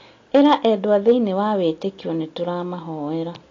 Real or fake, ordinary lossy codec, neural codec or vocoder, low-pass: real; AAC, 32 kbps; none; 7.2 kHz